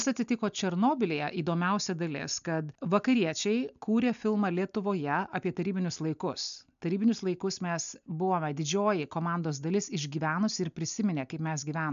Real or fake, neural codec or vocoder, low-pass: real; none; 7.2 kHz